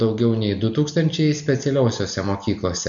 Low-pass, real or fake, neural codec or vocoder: 7.2 kHz; real; none